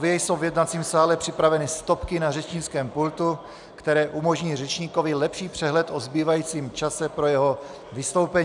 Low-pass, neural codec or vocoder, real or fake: 10.8 kHz; none; real